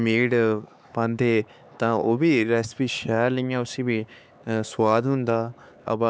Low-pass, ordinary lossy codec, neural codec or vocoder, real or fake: none; none; codec, 16 kHz, 4 kbps, X-Codec, HuBERT features, trained on LibriSpeech; fake